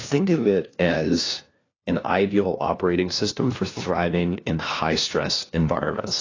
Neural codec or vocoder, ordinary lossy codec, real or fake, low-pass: codec, 16 kHz, 1 kbps, FunCodec, trained on LibriTTS, 50 frames a second; AAC, 32 kbps; fake; 7.2 kHz